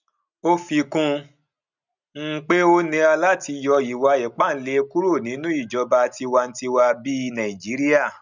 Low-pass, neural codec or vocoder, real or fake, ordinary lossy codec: 7.2 kHz; none; real; none